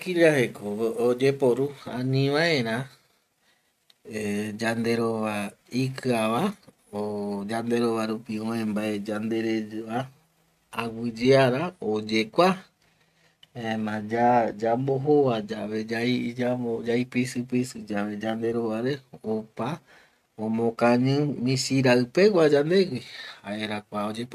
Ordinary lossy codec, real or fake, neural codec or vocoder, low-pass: none; real; none; 14.4 kHz